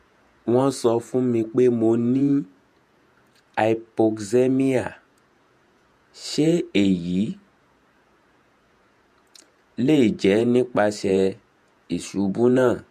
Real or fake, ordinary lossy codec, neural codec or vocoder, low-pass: fake; MP3, 64 kbps; vocoder, 48 kHz, 128 mel bands, Vocos; 14.4 kHz